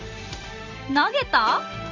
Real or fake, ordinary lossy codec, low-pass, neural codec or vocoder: real; Opus, 32 kbps; 7.2 kHz; none